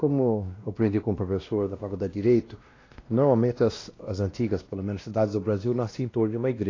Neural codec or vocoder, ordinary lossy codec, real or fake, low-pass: codec, 16 kHz, 1 kbps, X-Codec, WavLM features, trained on Multilingual LibriSpeech; AAC, 32 kbps; fake; 7.2 kHz